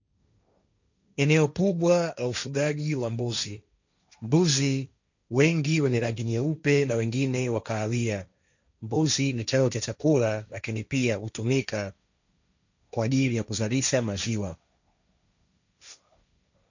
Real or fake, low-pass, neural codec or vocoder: fake; 7.2 kHz; codec, 16 kHz, 1.1 kbps, Voila-Tokenizer